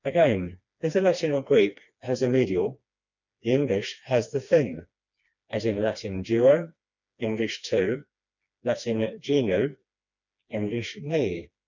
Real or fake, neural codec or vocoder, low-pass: fake; codec, 16 kHz, 2 kbps, FreqCodec, smaller model; 7.2 kHz